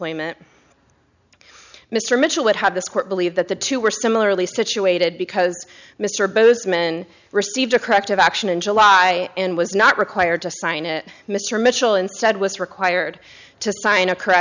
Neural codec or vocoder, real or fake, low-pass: none; real; 7.2 kHz